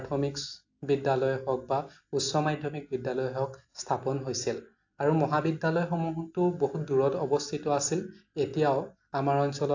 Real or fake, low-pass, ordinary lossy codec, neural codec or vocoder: real; 7.2 kHz; AAC, 48 kbps; none